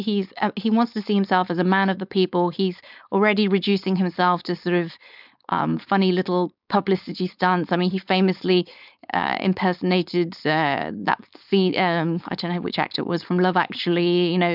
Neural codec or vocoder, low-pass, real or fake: codec, 16 kHz, 4.8 kbps, FACodec; 5.4 kHz; fake